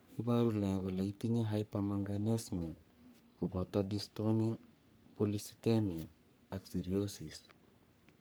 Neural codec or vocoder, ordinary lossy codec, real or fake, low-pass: codec, 44.1 kHz, 3.4 kbps, Pupu-Codec; none; fake; none